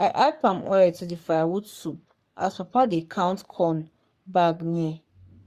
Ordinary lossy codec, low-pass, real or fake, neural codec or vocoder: Opus, 64 kbps; 14.4 kHz; fake; codec, 44.1 kHz, 3.4 kbps, Pupu-Codec